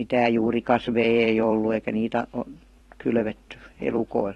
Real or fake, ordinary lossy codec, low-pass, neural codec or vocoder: fake; AAC, 32 kbps; 19.8 kHz; vocoder, 44.1 kHz, 128 mel bands every 256 samples, BigVGAN v2